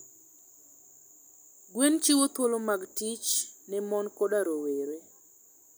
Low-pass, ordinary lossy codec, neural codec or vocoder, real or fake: none; none; none; real